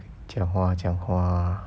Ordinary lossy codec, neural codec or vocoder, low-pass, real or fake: none; none; none; real